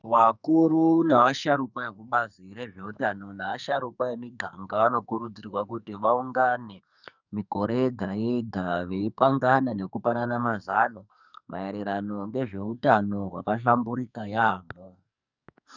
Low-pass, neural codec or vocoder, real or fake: 7.2 kHz; codec, 44.1 kHz, 2.6 kbps, SNAC; fake